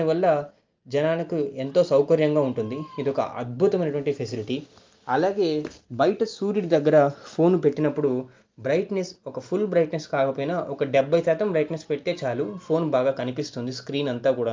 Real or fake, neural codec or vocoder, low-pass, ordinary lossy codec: real; none; 7.2 kHz; Opus, 24 kbps